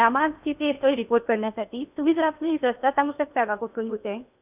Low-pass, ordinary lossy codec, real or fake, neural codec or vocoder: 3.6 kHz; none; fake; codec, 16 kHz in and 24 kHz out, 0.8 kbps, FocalCodec, streaming, 65536 codes